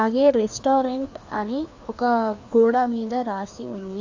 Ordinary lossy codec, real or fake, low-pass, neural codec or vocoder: AAC, 48 kbps; fake; 7.2 kHz; codec, 16 kHz, 2 kbps, FreqCodec, larger model